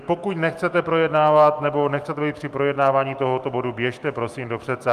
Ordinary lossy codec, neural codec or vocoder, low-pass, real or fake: Opus, 32 kbps; autoencoder, 48 kHz, 128 numbers a frame, DAC-VAE, trained on Japanese speech; 14.4 kHz; fake